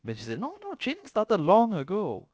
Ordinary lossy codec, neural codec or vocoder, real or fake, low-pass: none; codec, 16 kHz, about 1 kbps, DyCAST, with the encoder's durations; fake; none